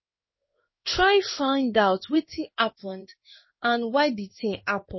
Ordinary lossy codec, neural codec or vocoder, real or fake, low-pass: MP3, 24 kbps; codec, 16 kHz in and 24 kHz out, 1 kbps, XY-Tokenizer; fake; 7.2 kHz